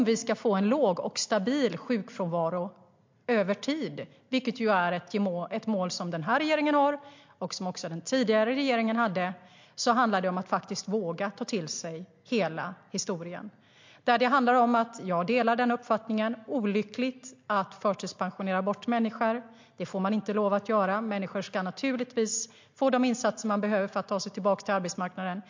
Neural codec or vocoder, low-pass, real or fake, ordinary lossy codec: none; 7.2 kHz; real; MP3, 48 kbps